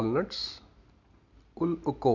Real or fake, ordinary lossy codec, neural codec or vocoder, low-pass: real; none; none; 7.2 kHz